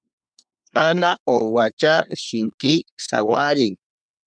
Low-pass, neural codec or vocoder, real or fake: 9.9 kHz; codec, 24 kHz, 1 kbps, SNAC; fake